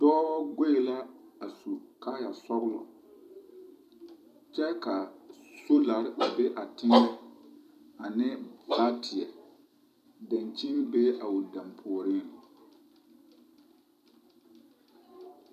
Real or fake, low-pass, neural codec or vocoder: fake; 14.4 kHz; vocoder, 44.1 kHz, 128 mel bands every 256 samples, BigVGAN v2